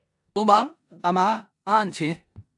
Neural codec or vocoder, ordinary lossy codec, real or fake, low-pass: codec, 16 kHz in and 24 kHz out, 0.9 kbps, LongCat-Audio-Codec, four codebook decoder; AAC, 64 kbps; fake; 10.8 kHz